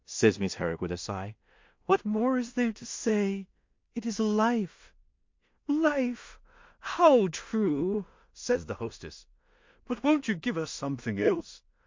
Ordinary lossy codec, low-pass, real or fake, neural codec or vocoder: MP3, 48 kbps; 7.2 kHz; fake; codec, 16 kHz in and 24 kHz out, 0.4 kbps, LongCat-Audio-Codec, two codebook decoder